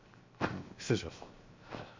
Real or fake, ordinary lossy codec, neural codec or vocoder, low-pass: fake; MP3, 48 kbps; codec, 16 kHz, 0.7 kbps, FocalCodec; 7.2 kHz